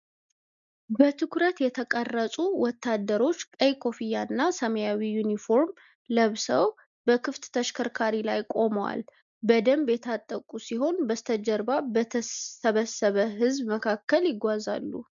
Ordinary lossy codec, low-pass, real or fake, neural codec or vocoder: MP3, 96 kbps; 7.2 kHz; real; none